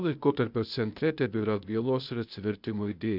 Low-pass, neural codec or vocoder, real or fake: 5.4 kHz; codec, 16 kHz, 0.8 kbps, ZipCodec; fake